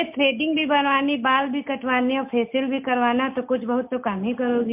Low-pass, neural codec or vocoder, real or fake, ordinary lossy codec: 3.6 kHz; none; real; MP3, 32 kbps